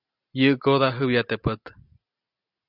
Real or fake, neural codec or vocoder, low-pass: real; none; 5.4 kHz